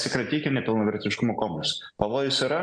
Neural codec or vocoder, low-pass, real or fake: none; 9.9 kHz; real